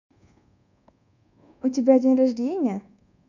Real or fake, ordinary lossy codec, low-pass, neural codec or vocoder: fake; none; 7.2 kHz; codec, 24 kHz, 1.2 kbps, DualCodec